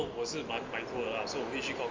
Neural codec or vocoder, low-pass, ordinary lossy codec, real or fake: none; 7.2 kHz; Opus, 32 kbps; real